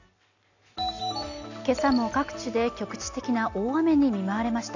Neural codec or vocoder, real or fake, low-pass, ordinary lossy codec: none; real; 7.2 kHz; none